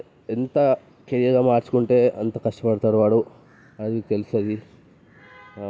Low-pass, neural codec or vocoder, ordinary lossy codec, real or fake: none; none; none; real